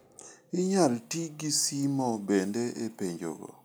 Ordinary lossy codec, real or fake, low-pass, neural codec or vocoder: none; real; none; none